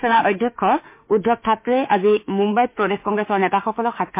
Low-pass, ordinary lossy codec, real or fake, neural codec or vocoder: 3.6 kHz; MP3, 24 kbps; fake; autoencoder, 48 kHz, 32 numbers a frame, DAC-VAE, trained on Japanese speech